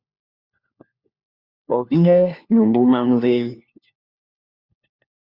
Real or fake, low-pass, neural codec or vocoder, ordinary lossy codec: fake; 5.4 kHz; codec, 16 kHz, 1 kbps, FunCodec, trained on LibriTTS, 50 frames a second; Opus, 64 kbps